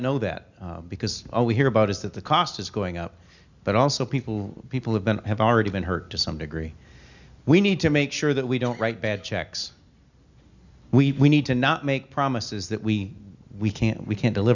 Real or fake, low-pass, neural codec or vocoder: real; 7.2 kHz; none